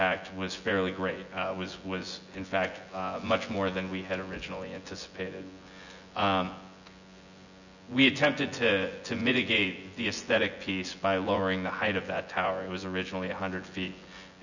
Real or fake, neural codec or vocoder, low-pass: fake; vocoder, 24 kHz, 100 mel bands, Vocos; 7.2 kHz